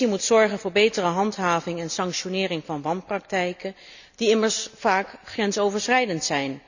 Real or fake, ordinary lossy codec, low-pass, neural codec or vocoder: real; none; 7.2 kHz; none